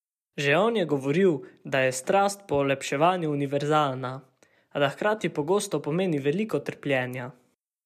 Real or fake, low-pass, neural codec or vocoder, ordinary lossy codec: real; 14.4 kHz; none; MP3, 96 kbps